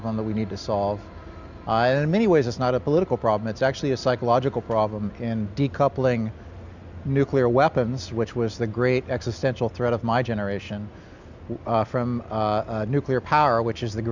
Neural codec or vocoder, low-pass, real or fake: none; 7.2 kHz; real